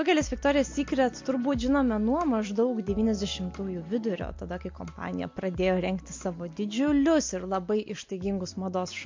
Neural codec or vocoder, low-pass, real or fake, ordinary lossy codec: none; 7.2 kHz; real; MP3, 48 kbps